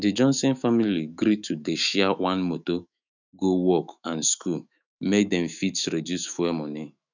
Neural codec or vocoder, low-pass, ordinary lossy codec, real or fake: autoencoder, 48 kHz, 128 numbers a frame, DAC-VAE, trained on Japanese speech; 7.2 kHz; none; fake